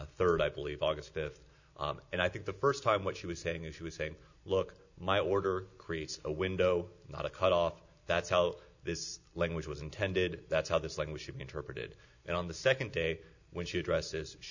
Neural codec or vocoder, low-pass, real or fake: none; 7.2 kHz; real